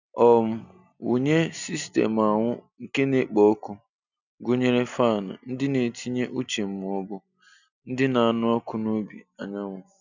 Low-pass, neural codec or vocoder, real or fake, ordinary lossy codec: 7.2 kHz; none; real; none